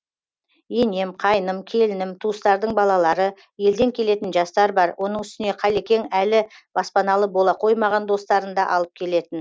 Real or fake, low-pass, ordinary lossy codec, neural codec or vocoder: real; none; none; none